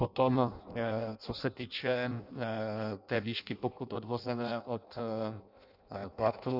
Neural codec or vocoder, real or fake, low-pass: codec, 16 kHz in and 24 kHz out, 0.6 kbps, FireRedTTS-2 codec; fake; 5.4 kHz